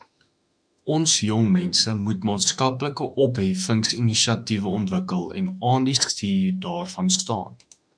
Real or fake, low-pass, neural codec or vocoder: fake; 9.9 kHz; autoencoder, 48 kHz, 32 numbers a frame, DAC-VAE, trained on Japanese speech